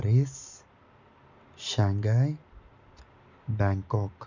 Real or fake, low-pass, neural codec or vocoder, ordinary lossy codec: real; 7.2 kHz; none; none